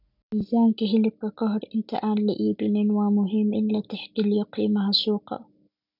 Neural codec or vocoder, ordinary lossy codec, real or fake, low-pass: none; none; real; 5.4 kHz